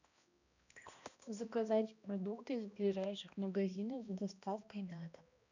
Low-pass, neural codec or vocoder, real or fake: 7.2 kHz; codec, 16 kHz, 1 kbps, X-Codec, HuBERT features, trained on balanced general audio; fake